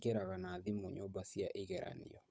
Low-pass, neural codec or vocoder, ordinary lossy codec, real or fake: none; codec, 16 kHz, 8 kbps, FunCodec, trained on Chinese and English, 25 frames a second; none; fake